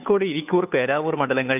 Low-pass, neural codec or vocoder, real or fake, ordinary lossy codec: 3.6 kHz; codec, 16 kHz, 2 kbps, FunCodec, trained on Chinese and English, 25 frames a second; fake; none